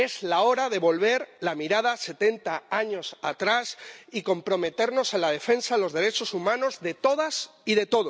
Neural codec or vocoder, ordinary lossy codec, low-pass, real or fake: none; none; none; real